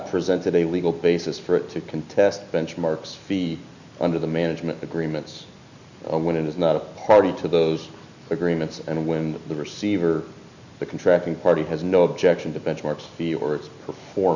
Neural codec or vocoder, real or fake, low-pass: none; real; 7.2 kHz